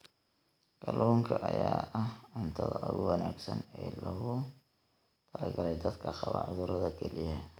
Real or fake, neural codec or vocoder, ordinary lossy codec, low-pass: real; none; none; none